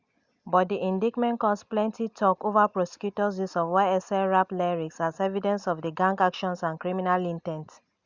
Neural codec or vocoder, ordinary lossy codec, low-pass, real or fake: none; Opus, 64 kbps; 7.2 kHz; real